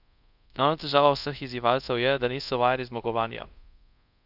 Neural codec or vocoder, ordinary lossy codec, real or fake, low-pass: codec, 24 kHz, 0.5 kbps, DualCodec; none; fake; 5.4 kHz